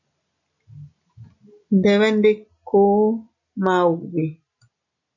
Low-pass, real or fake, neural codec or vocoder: 7.2 kHz; real; none